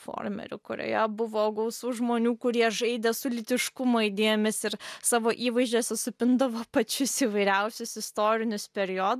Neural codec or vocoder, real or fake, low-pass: none; real; 14.4 kHz